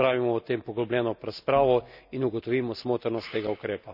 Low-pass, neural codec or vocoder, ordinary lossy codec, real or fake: 5.4 kHz; none; none; real